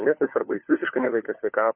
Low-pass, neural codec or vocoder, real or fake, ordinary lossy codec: 3.6 kHz; codec, 16 kHz, 4 kbps, FunCodec, trained on LibriTTS, 50 frames a second; fake; MP3, 24 kbps